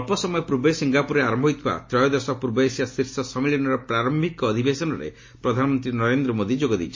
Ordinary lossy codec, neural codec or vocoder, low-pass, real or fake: MP3, 32 kbps; none; 7.2 kHz; real